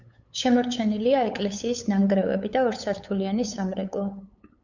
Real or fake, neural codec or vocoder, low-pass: fake; codec, 16 kHz, 8 kbps, FunCodec, trained on LibriTTS, 25 frames a second; 7.2 kHz